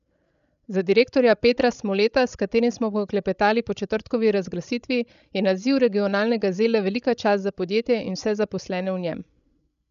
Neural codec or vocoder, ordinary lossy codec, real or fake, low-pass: codec, 16 kHz, 16 kbps, FreqCodec, larger model; none; fake; 7.2 kHz